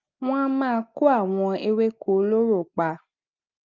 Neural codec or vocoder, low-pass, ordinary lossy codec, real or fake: none; 7.2 kHz; Opus, 24 kbps; real